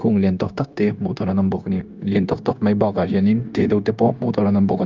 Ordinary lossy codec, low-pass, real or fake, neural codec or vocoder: Opus, 24 kbps; 7.2 kHz; fake; codec, 16 kHz, 0.9 kbps, LongCat-Audio-Codec